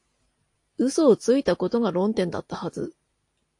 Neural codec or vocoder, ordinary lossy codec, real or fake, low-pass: none; AAC, 48 kbps; real; 10.8 kHz